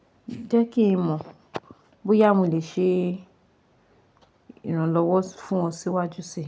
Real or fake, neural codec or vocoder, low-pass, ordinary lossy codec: real; none; none; none